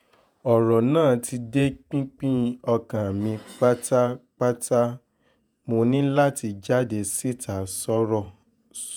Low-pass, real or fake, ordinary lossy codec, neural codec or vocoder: none; fake; none; vocoder, 48 kHz, 128 mel bands, Vocos